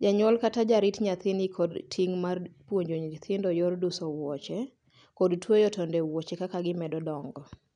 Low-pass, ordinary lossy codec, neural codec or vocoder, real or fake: 9.9 kHz; none; none; real